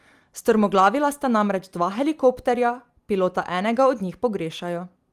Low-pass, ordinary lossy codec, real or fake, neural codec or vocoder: 14.4 kHz; Opus, 32 kbps; real; none